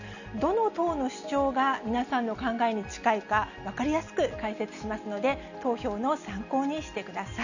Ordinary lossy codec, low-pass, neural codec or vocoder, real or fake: none; 7.2 kHz; none; real